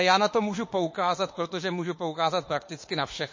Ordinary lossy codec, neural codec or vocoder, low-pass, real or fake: MP3, 32 kbps; autoencoder, 48 kHz, 32 numbers a frame, DAC-VAE, trained on Japanese speech; 7.2 kHz; fake